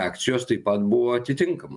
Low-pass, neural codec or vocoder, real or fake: 10.8 kHz; none; real